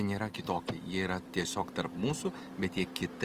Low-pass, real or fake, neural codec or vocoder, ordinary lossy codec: 14.4 kHz; fake; vocoder, 44.1 kHz, 128 mel bands every 256 samples, BigVGAN v2; Opus, 24 kbps